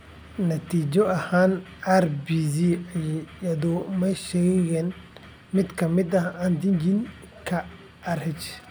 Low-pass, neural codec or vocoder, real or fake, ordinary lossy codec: none; none; real; none